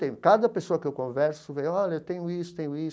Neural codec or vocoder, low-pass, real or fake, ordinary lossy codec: none; none; real; none